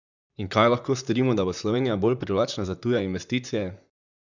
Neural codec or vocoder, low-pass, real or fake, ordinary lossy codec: vocoder, 44.1 kHz, 128 mel bands, Pupu-Vocoder; 7.2 kHz; fake; none